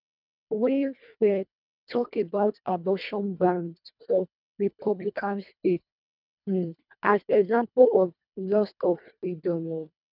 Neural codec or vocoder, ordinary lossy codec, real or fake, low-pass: codec, 24 kHz, 1.5 kbps, HILCodec; none; fake; 5.4 kHz